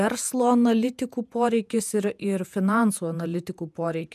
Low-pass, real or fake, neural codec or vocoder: 14.4 kHz; fake; vocoder, 48 kHz, 128 mel bands, Vocos